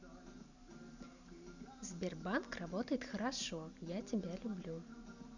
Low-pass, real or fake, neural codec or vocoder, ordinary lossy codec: 7.2 kHz; real; none; none